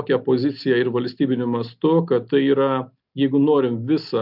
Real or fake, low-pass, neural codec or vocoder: real; 5.4 kHz; none